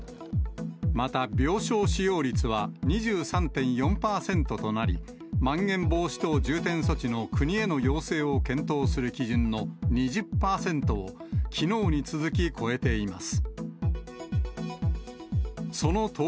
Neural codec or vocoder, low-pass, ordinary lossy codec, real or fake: none; none; none; real